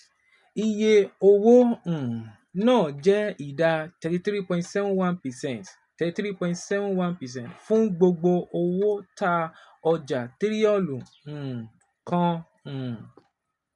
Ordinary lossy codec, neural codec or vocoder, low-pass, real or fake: none; none; 10.8 kHz; real